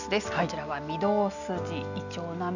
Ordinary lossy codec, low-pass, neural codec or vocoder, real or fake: none; 7.2 kHz; none; real